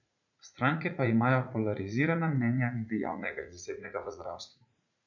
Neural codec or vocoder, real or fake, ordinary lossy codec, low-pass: vocoder, 44.1 kHz, 80 mel bands, Vocos; fake; none; 7.2 kHz